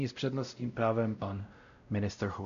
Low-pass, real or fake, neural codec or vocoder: 7.2 kHz; fake; codec, 16 kHz, 0.5 kbps, X-Codec, WavLM features, trained on Multilingual LibriSpeech